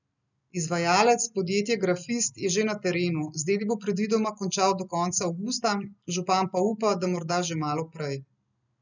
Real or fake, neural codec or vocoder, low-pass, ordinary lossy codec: real; none; 7.2 kHz; none